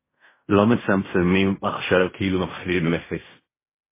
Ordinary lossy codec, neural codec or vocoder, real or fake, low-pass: MP3, 16 kbps; codec, 16 kHz in and 24 kHz out, 0.4 kbps, LongCat-Audio-Codec, fine tuned four codebook decoder; fake; 3.6 kHz